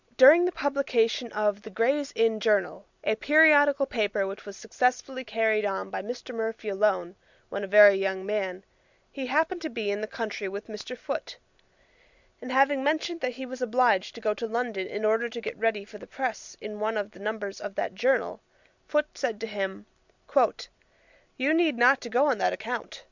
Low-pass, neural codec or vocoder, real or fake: 7.2 kHz; none; real